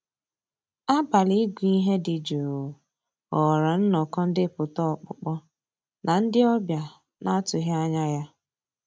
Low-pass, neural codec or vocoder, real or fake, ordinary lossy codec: none; none; real; none